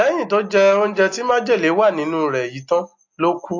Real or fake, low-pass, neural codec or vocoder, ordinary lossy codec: real; 7.2 kHz; none; AAC, 48 kbps